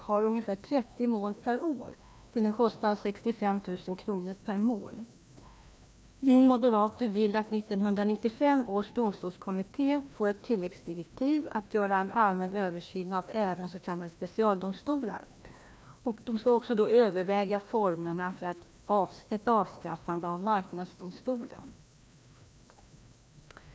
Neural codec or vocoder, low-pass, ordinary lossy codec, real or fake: codec, 16 kHz, 1 kbps, FreqCodec, larger model; none; none; fake